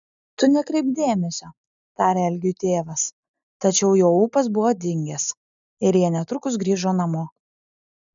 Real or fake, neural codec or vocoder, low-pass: real; none; 7.2 kHz